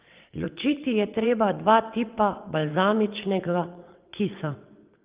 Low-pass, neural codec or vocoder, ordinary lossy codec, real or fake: 3.6 kHz; vocoder, 22.05 kHz, 80 mel bands, WaveNeXt; Opus, 32 kbps; fake